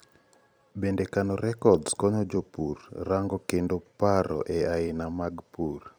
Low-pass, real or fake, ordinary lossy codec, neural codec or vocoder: none; fake; none; vocoder, 44.1 kHz, 128 mel bands every 256 samples, BigVGAN v2